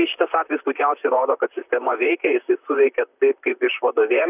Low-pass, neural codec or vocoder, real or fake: 3.6 kHz; vocoder, 44.1 kHz, 128 mel bands, Pupu-Vocoder; fake